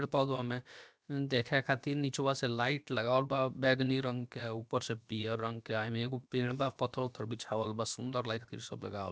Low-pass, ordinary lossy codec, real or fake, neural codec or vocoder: none; none; fake; codec, 16 kHz, about 1 kbps, DyCAST, with the encoder's durations